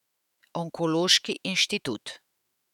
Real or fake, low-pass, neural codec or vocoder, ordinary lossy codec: fake; 19.8 kHz; autoencoder, 48 kHz, 128 numbers a frame, DAC-VAE, trained on Japanese speech; none